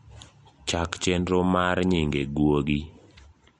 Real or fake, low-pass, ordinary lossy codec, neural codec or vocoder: real; 19.8 kHz; MP3, 48 kbps; none